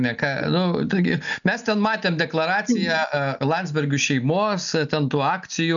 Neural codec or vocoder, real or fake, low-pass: none; real; 7.2 kHz